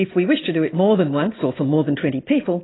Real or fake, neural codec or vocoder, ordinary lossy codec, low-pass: real; none; AAC, 16 kbps; 7.2 kHz